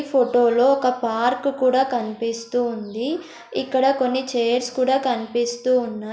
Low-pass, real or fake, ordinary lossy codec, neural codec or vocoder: none; real; none; none